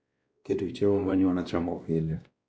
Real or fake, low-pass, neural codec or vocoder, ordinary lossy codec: fake; none; codec, 16 kHz, 1 kbps, X-Codec, WavLM features, trained on Multilingual LibriSpeech; none